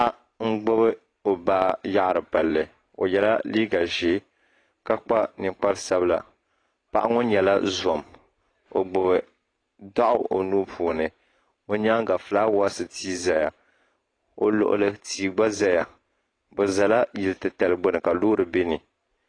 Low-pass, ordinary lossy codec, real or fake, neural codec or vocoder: 9.9 kHz; AAC, 32 kbps; fake; vocoder, 44.1 kHz, 128 mel bands every 512 samples, BigVGAN v2